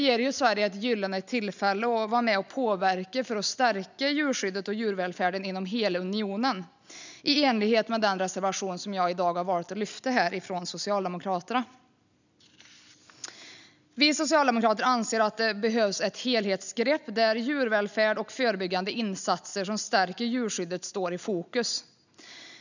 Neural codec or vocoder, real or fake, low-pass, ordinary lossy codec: none; real; 7.2 kHz; none